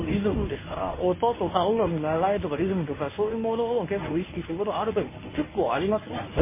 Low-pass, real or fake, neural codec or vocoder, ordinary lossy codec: 3.6 kHz; fake; codec, 24 kHz, 0.9 kbps, WavTokenizer, medium speech release version 1; MP3, 16 kbps